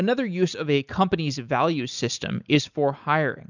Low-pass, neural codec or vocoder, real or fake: 7.2 kHz; none; real